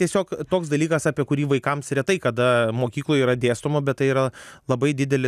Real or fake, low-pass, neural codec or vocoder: real; 14.4 kHz; none